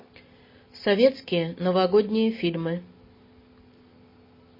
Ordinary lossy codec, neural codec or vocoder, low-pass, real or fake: MP3, 24 kbps; none; 5.4 kHz; real